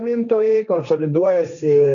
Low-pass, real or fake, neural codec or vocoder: 7.2 kHz; fake; codec, 16 kHz, 1.1 kbps, Voila-Tokenizer